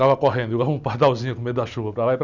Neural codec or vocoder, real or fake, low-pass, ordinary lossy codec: none; real; 7.2 kHz; none